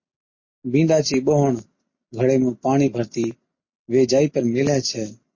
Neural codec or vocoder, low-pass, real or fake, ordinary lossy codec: none; 7.2 kHz; real; MP3, 32 kbps